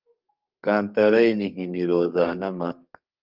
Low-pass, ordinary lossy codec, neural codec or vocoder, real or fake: 5.4 kHz; Opus, 24 kbps; codec, 32 kHz, 1.9 kbps, SNAC; fake